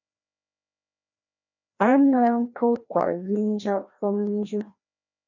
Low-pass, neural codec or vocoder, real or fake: 7.2 kHz; codec, 16 kHz, 1 kbps, FreqCodec, larger model; fake